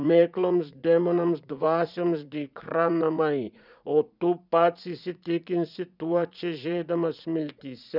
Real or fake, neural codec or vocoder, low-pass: fake; vocoder, 44.1 kHz, 80 mel bands, Vocos; 5.4 kHz